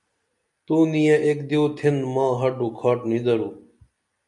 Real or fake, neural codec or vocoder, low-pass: real; none; 10.8 kHz